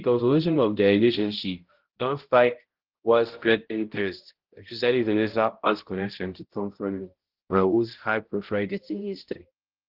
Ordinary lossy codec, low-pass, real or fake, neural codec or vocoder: Opus, 16 kbps; 5.4 kHz; fake; codec, 16 kHz, 0.5 kbps, X-Codec, HuBERT features, trained on balanced general audio